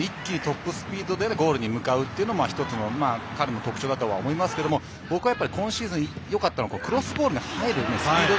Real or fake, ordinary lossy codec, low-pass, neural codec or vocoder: real; none; none; none